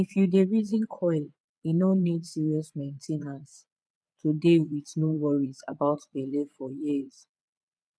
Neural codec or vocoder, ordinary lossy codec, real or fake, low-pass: vocoder, 22.05 kHz, 80 mel bands, Vocos; none; fake; none